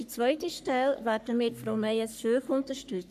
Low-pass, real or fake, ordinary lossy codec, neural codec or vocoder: 14.4 kHz; fake; none; codec, 44.1 kHz, 3.4 kbps, Pupu-Codec